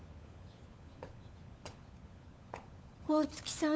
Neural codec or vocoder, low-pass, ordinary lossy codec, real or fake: codec, 16 kHz, 16 kbps, FunCodec, trained on LibriTTS, 50 frames a second; none; none; fake